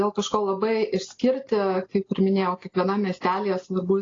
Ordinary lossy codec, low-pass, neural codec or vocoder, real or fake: AAC, 32 kbps; 7.2 kHz; none; real